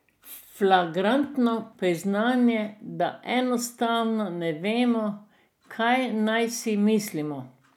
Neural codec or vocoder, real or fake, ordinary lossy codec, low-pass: none; real; none; 19.8 kHz